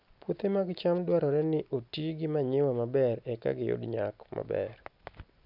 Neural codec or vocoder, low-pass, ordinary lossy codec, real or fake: none; 5.4 kHz; none; real